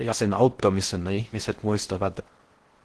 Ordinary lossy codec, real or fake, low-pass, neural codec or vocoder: Opus, 16 kbps; fake; 10.8 kHz; codec, 16 kHz in and 24 kHz out, 0.6 kbps, FocalCodec, streaming, 4096 codes